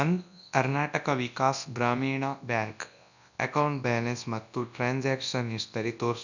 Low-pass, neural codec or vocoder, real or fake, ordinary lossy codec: 7.2 kHz; codec, 24 kHz, 0.9 kbps, WavTokenizer, large speech release; fake; none